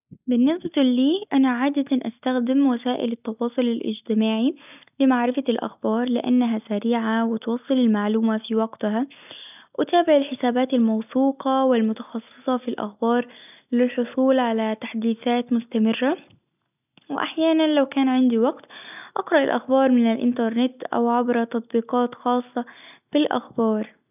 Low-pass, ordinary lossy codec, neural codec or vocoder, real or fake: 3.6 kHz; none; none; real